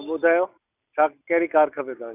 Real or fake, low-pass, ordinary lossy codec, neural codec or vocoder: real; 3.6 kHz; none; none